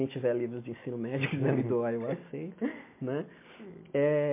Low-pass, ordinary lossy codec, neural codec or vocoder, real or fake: 3.6 kHz; MP3, 24 kbps; none; real